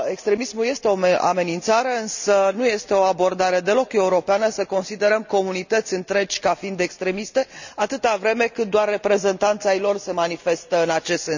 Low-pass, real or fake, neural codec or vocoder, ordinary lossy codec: 7.2 kHz; real; none; none